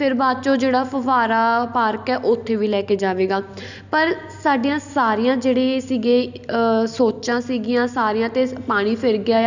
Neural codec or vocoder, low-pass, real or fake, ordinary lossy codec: none; 7.2 kHz; real; none